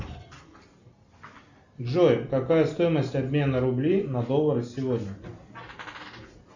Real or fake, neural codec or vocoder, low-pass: real; none; 7.2 kHz